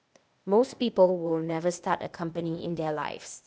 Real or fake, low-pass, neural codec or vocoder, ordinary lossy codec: fake; none; codec, 16 kHz, 0.8 kbps, ZipCodec; none